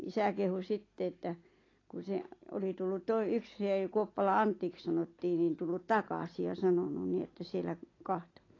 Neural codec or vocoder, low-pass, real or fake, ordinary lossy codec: none; 7.2 kHz; real; AAC, 32 kbps